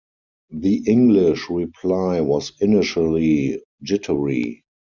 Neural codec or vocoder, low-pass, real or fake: none; 7.2 kHz; real